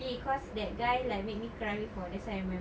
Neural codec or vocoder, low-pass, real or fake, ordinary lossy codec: none; none; real; none